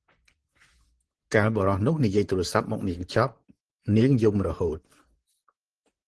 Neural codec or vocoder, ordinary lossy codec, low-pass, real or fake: vocoder, 44.1 kHz, 128 mel bands, Pupu-Vocoder; Opus, 16 kbps; 10.8 kHz; fake